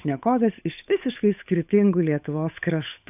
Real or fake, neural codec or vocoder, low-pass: fake; codec, 16 kHz, 8 kbps, FunCodec, trained on Chinese and English, 25 frames a second; 3.6 kHz